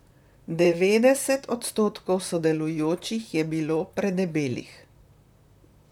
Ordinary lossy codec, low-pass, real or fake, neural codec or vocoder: none; 19.8 kHz; fake; vocoder, 44.1 kHz, 128 mel bands, Pupu-Vocoder